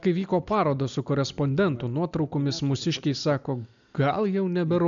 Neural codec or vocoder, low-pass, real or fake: none; 7.2 kHz; real